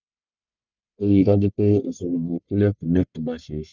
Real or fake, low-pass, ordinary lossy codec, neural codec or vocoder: fake; 7.2 kHz; none; codec, 44.1 kHz, 1.7 kbps, Pupu-Codec